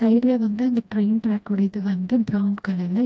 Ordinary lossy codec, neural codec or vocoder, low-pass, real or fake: none; codec, 16 kHz, 1 kbps, FreqCodec, smaller model; none; fake